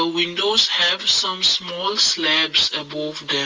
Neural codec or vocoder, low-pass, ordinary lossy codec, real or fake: none; 7.2 kHz; Opus, 24 kbps; real